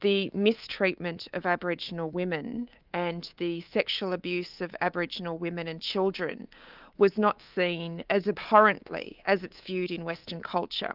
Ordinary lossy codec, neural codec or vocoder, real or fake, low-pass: Opus, 32 kbps; codec, 24 kHz, 3.1 kbps, DualCodec; fake; 5.4 kHz